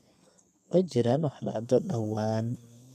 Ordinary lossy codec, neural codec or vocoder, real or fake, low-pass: none; codec, 32 kHz, 1.9 kbps, SNAC; fake; 14.4 kHz